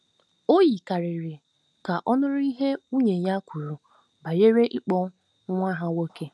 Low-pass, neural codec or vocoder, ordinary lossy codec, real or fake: none; none; none; real